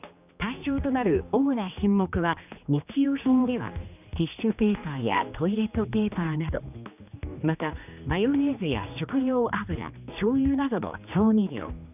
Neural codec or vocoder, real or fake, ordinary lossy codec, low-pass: codec, 16 kHz, 2 kbps, X-Codec, HuBERT features, trained on general audio; fake; none; 3.6 kHz